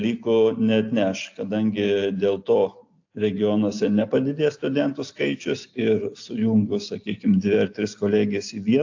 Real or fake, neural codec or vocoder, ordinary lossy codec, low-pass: real; none; AAC, 48 kbps; 7.2 kHz